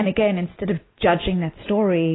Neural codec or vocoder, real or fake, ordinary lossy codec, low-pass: none; real; AAC, 16 kbps; 7.2 kHz